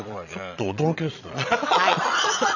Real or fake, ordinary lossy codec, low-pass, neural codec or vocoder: fake; none; 7.2 kHz; vocoder, 22.05 kHz, 80 mel bands, Vocos